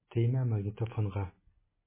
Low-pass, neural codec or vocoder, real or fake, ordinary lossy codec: 3.6 kHz; none; real; MP3, 16 kbps